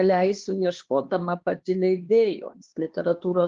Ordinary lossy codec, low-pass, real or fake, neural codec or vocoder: Opus, 16 kbps; 7.2 kHz; fake; codec, 16 kHz, 2 kbps, X-Codec, HuBERT features, trained on LibriSpeech